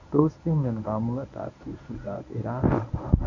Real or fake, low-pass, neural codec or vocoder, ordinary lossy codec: fake; 7.2 kHz; codec, 24 kHz, 0.9 kbps, WavTokenizer, medium speech release version 1; none